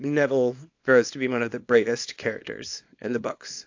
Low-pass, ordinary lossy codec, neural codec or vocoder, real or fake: 7.2 kHz; AAC, 48 kbps; codec, 24 kHz, 0.9 kbps, WavTokenizer, small release; fake